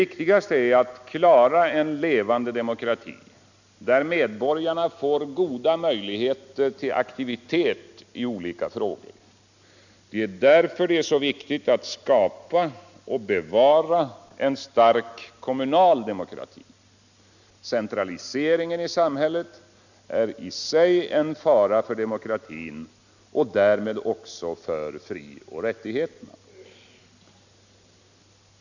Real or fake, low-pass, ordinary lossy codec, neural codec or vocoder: real; 7.2 kHz; none; none